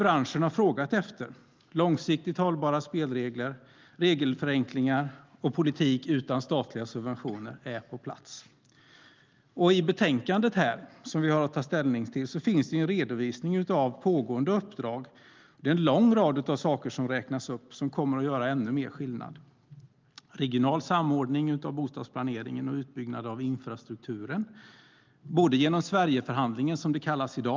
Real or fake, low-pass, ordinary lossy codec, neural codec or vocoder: real; 7.2 kHz; Opus, 24 kbps; none